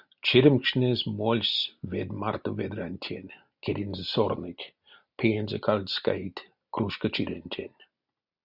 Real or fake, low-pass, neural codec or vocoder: real; 5.4 kHz; none